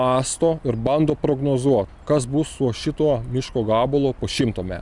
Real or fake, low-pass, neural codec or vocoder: real; 10.8 kHz; none